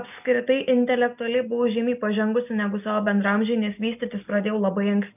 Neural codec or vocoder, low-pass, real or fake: none; 3.6 kHz; real